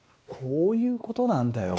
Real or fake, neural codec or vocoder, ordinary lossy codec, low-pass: fake; codec, 16 kHz, 2 kbps, X-Codec, WavLM features, trained on Multilingual LibriSpeech; none; none